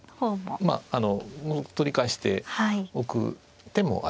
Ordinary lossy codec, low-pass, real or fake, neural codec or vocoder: none; none; real; none